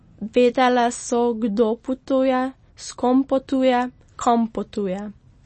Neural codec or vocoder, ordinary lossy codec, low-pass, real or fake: none; MP3, 32 kbps; 10.8 kHz; real